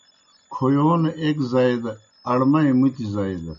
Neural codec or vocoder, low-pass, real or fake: none; 7.2 kHz; real